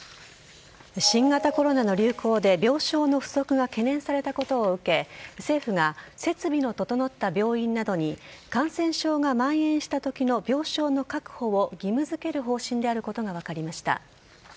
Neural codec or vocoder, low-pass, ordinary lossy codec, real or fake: none; none; none; real